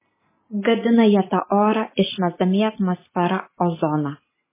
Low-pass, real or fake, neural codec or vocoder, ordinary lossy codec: 3.6 kHz; real; none; MP3, 16 kbps